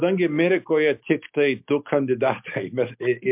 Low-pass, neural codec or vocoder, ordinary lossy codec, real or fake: 3.6 kHz; none; MP3, 32 kbps; real